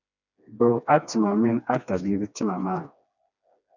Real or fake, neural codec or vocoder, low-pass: fake; codec, 16 kHz, 2 kbps, FreqCodec, smaller model; 7.2 kHz